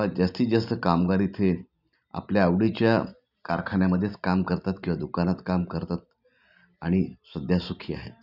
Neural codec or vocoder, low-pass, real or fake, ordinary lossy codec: none; 5.4 kHz; real; none